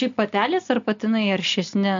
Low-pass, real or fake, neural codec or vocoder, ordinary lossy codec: 7.2 kHz; real; none; MP3, 48 kbps